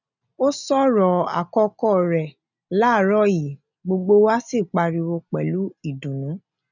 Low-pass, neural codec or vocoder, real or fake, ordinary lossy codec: 7.2 kHz; none; real; none